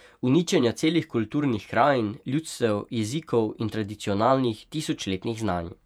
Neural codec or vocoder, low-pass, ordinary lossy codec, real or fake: vocoder, 48 kHz, 128 mel bands, Vocos; 19.8 kHz; none; fake